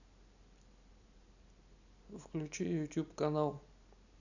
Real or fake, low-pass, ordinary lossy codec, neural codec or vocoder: real; 7.2 kHz; none; none